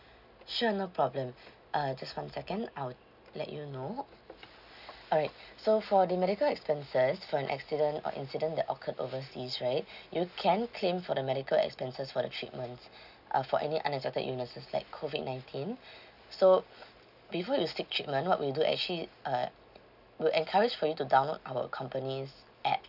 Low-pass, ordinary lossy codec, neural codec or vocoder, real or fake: 5.4 kHz; none; none; real